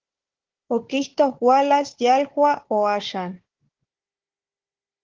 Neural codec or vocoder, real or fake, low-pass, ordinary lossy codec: codec, 16 kHz, 4 kbps, FunCodec, trained on Chinese and English, 50 frames a second; fake; 7.2 kHz; Opus, 16 kbps